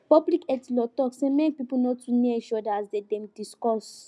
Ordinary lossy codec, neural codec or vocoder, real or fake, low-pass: none; none; real; none